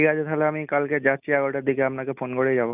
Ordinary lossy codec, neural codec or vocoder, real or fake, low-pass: none; none; real; 3.6 kHz